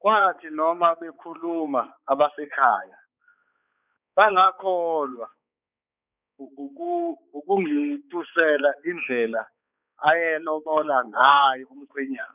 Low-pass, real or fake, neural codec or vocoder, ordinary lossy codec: 3.6 kHz; fake; codec, 16 kHz, 4 kbps, X-Codec, HuBERT features, trained on balanced general audio; none